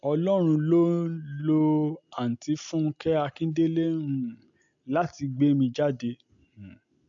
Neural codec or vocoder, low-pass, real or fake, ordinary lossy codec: none; 7.2 kHz; real; AAC, 64 kbps